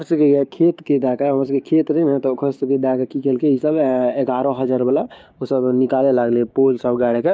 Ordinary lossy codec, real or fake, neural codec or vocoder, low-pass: none; fake; codec, 16 kHz, 6 kbps, DAC; none